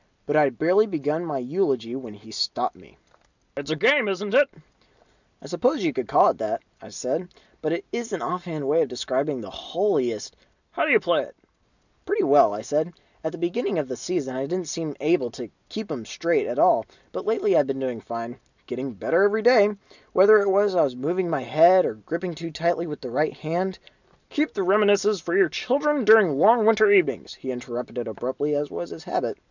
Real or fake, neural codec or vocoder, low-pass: real; none; 7.2 kHz